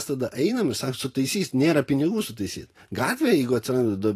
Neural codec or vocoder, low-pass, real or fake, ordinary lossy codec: vocoder, 44.1 kHz, 128 mel bands every 512 samples, BigVGAN v2; 14.4 kHz; fake; AAC, 48 kbps